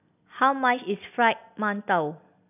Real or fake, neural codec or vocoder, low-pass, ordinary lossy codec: real; none; 3.6 kHz; none